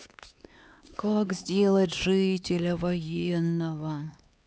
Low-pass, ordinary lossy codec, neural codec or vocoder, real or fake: none; none; codec, 16 kHz, 4 kbps, X-Codec, HuBERT features, trained on LibriSpeech; fake